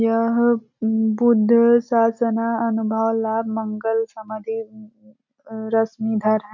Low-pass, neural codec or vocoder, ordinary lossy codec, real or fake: 7.2 kHz; none; none; real